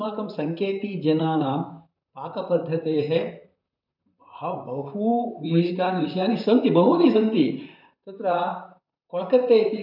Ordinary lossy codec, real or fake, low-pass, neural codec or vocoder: none; fake; 5.4 kHz; vocoder, 44.1 kHz, 128 mel bands, Pupu-Vocoder